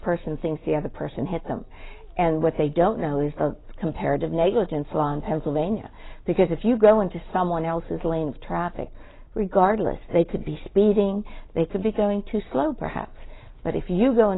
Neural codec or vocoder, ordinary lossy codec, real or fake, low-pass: none; AAC, 16 kbps; real; 7.2 kHz